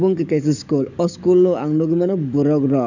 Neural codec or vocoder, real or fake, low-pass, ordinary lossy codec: codec, 44.1 kHz, 7.8 kbps, DAC; fake; 7.2 kHz; none